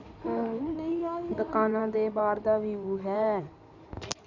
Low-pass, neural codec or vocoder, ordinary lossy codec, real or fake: 7.2 kHz; codec, 16 kHz in and 24 kHz out, 2.2 kbps, FireRedTTS-2 codec; none; fake